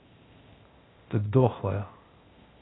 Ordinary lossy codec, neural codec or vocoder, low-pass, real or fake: AAC, 16 kbps; codec, 16 kHz, 0.8 kbps, ZipCodec; 7.2 kHz; fake